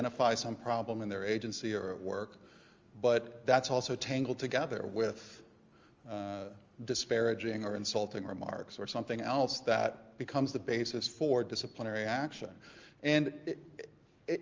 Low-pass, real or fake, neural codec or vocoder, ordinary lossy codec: 7.2 kHz; real; none; Opus, 32 kbps